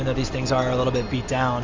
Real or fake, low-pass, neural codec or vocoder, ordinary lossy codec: real; 7.2 kHz; none; Opus, 32 kbps